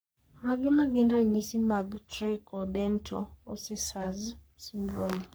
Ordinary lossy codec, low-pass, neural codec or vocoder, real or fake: none; none; codec, 44.1 kHz, 3.4 kbps, Pupu-Codec; fake